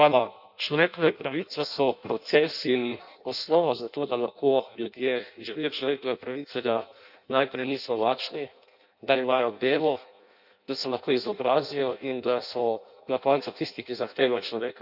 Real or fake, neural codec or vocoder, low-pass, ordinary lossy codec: fake; codec, 16 kHz in and 24 kHz out, 0.6 kbps, FireRedTTS-2 codec; 5.4 kHz; none